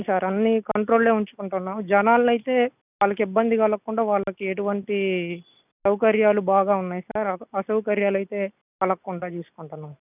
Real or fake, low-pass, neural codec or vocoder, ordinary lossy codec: real; 3.6 kHz; none; none